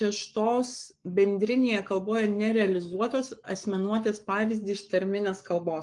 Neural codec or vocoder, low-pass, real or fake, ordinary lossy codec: codec, 44.1 kHz, 7.8 kbps, Pupu-Codec; 10.8 kHz; fake; Opus, 32 kbps